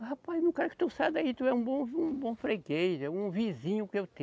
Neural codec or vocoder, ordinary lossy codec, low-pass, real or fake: none; none; none; real